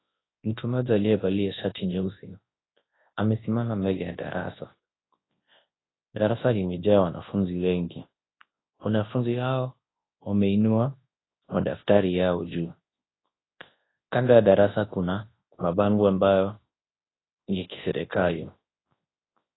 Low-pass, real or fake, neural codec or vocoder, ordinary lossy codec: 7.2 kHz; fake; codec, 24 kHz, 0.9 kbps, WavTokenizer, large speech release; AAC, 16 kbps